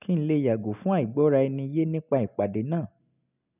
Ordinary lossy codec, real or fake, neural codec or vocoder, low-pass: none; real; none; 3.6 kHz